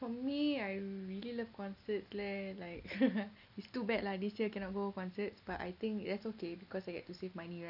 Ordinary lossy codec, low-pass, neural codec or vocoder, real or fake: none; 5.4 kHz; none; real